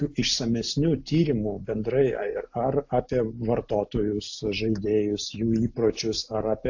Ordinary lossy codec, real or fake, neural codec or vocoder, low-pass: AAC, 48 kbps; real; none; 7.2 kHz